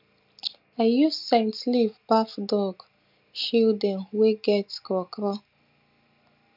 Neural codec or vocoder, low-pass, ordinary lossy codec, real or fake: none; 5.4 kHz; MP3, 48 kbps; real